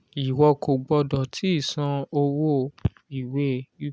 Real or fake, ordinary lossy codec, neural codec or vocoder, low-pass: real; none; none; none